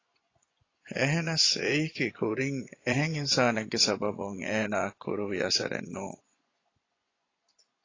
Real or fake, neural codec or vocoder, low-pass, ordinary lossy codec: fake; vocoder, 24 kHz, 100 mel bands, Vocos; 7.2 kHz; AAC, 32 kbps